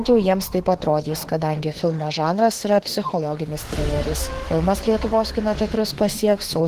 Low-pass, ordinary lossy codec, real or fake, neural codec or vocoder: 14.4 kHz; Opus, 16 kbps; fake; autoencoder, 48 kHz, 32 numbers a frame, DAC-VAE, trained on Japanese speech